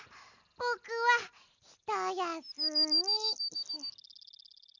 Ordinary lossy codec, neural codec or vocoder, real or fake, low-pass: none; none; real; 7.2 kHz